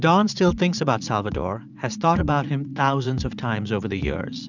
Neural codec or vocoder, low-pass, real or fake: vocoder, 44.1 kHz, 80 mel bands, Vocos; 7.2 kHz; fake